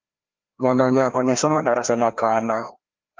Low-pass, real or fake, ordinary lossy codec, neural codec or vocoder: 7.2 kHz; fake; Opus, 24 kbps; codec, 16 kHz, 1 kbps, FreqCodec, larger model